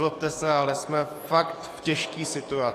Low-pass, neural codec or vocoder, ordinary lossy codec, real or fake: 14.4 kHz; codec, 44.1 kHz, 7.8 kbps, DAC; AAC, 48 kbps; fake